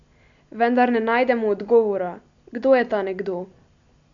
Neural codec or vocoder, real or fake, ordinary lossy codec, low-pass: none; real; none; 7.2 kHz